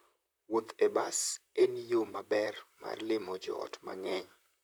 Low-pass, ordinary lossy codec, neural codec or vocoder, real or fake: none; none; vocoder, 44.1 kHz, 128 mel bands, Pupu-Vocoder; fake